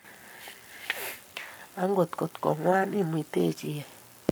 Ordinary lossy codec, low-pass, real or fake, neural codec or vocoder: none; none; fake; codec, 44.1 kHz, 7.8 kbps, Pupu-Codec